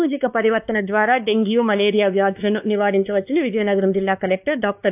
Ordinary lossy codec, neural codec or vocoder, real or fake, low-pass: AAC, 32 kbps; codec, 16 kHz, 2 kbps, X-Codec, WavLM features, trained on Multilingual LibriSpeech; fake; 3.6 kHz